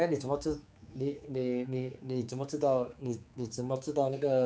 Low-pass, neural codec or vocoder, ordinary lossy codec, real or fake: none; codec, 16 kHz, 4 kbps, X-Codec, HuBERT features, trained on general audio; none; fake